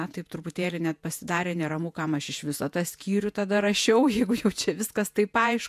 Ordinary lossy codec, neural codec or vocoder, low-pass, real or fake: AAC, 96 kbps; vocoder, 48 kHz, 128 mel bands, Vocos; 14.4 kHz; fake